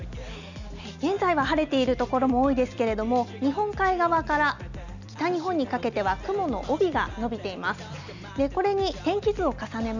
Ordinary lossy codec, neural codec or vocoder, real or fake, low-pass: none; none; real; 7.2 kHz